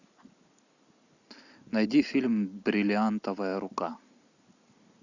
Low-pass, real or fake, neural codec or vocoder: 7.2 kHz; real; none